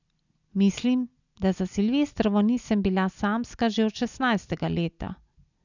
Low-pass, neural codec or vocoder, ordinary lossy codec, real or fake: 7.2 kHz; none; none; real